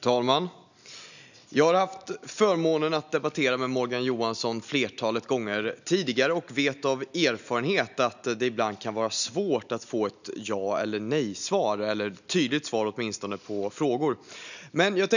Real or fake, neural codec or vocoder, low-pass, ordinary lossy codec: real; none; 7.2 kHz; none